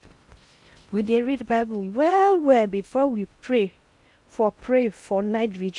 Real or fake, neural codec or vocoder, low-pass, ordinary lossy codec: fake; codec, 16 kHz in and 24 kHz out, 0.6 kbps, FocalCodec, streaming, 4096 codes; 10.8 kHz; MP3, 64 kbps